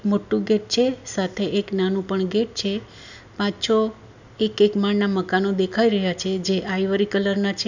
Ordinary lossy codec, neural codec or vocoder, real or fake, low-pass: none; none; real; 7.2 kHz